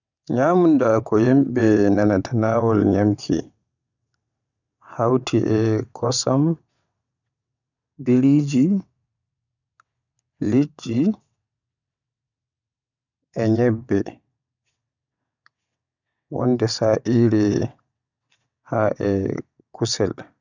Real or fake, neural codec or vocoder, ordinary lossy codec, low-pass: fake; vocoder, 22.05 kHz, 80 mel bands, WaveNeXt; none; 7.2 kHz